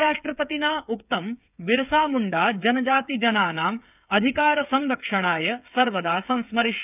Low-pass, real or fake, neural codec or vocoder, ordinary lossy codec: 3.6 kHz; fake; codec, 16 kHz, 8 kbps, FreqCodec, smaller model; none